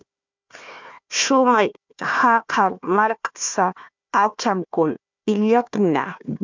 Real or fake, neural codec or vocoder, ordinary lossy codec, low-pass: fake; codec, 16 kHz, 1 kbps, FunCodec, trained on Chinese and English, 50 frames a second; MP3, 64 kbps; 7.2 kHz